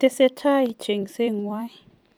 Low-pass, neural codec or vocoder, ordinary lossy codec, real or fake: none; vocoder, 44.1 kHz, 128 mel bands every 256 samples, BigVGAN v2; none; fake